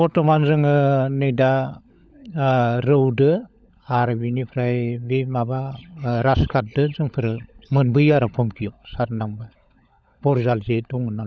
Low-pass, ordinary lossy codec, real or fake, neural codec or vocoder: none; none; fake; codec, 16 kHz, 16 kbps, FunCodec, trained on LibriTTS, 50 frames a second